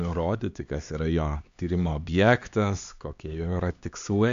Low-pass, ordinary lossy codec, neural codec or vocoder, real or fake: 7.2 kHz; MP3, 64 kbps; codec, 16 kHz, 2 kbps, X-Codec, HuBERT features, trained on LibriSpeech; fake